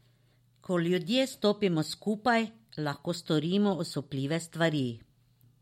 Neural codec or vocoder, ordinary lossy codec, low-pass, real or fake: none; MP3, 64 kbps; 19.8 kHz; real